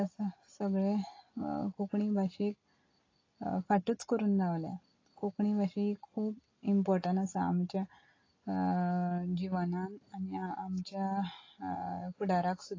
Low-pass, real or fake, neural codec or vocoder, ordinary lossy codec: 7.2 kHz; real; none; AAC, 32 kbps